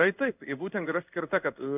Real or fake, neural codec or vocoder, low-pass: fake; codec, 16 kHz in and 24 kHz out, 1 kbps, XY-Tokenizer; 3.6 kHz